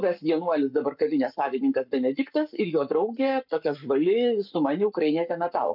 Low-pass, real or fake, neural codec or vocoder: 5.4 kHz; fake; codec, 44.1 kHz, 7.8 kbps, Pupu-Codec